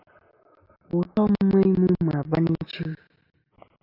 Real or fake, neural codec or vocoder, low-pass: real; none; 5.4 kHz